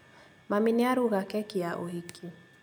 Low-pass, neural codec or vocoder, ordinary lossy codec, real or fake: none; none; none; real